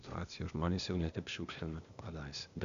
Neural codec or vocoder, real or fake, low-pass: codec, 16 kHz, 0.8 kbps, ZipCodec; fake; 7.2 kHz